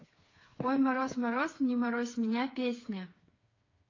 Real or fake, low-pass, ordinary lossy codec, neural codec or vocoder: fake; 7.2 kHz; AAC, 32 kbps; codec, 16 kHz, 4 kbps, FreqCodec, smaller model